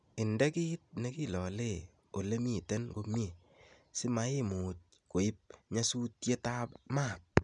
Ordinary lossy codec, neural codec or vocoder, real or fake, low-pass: none; none; real; 9.9 kHz